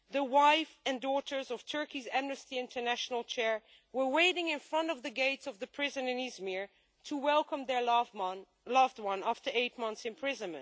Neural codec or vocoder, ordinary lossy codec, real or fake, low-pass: none; none; real; none